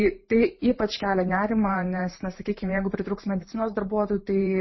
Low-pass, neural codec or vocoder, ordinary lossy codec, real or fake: 7.2 kHz; vocoder, 44.1 kHz, 128 mel bands every 512 samples, BigVGAN v2; MP3, 24 kbps; fake